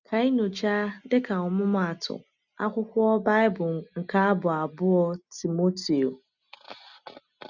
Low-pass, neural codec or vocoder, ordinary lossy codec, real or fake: 7.2 kHz; none; none; real